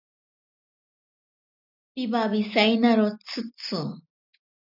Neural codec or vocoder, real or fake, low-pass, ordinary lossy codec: none; real; 5.4 kHz; AAC, 48 kbps